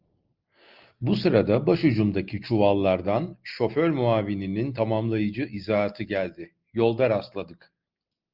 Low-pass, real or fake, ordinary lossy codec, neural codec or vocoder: 5.4 kHz; real; Opus, 24 kbps; none